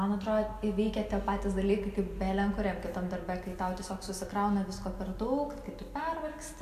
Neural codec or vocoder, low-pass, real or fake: none; 14.4 kHz; real